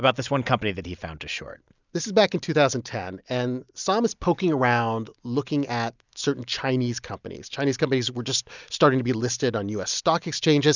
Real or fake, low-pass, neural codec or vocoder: real; 7.2 kHz; none